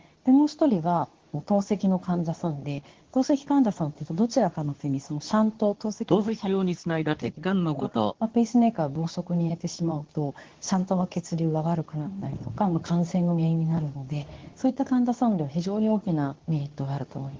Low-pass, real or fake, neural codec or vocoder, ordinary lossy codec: 7.2 kHz; fake; codec, 24 kHz, 0.9 kbps, WavTokenizer, medium speech release version 2; Opus, 16 kbps